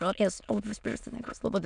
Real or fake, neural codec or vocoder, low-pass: fake; autoencoder, 22.05 kHz, a latent of 192 numbers a frame, VITS, trained on many speakers; 9.9 kHz